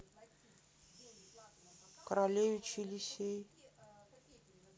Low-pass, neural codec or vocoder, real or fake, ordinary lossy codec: none; none; real; none